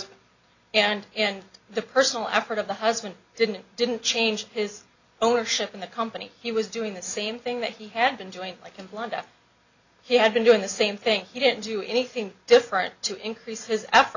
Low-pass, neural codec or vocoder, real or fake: 7.2 kHz; none; real